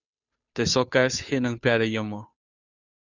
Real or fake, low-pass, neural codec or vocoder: fake; 7.2 kHz; codec, 16 kHz, 2 kbps, FunCodec, trained on Chinese and English, 25 frames a second